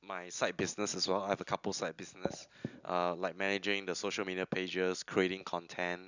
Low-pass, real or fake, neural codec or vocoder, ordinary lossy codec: 7.2 kHz; real; none; none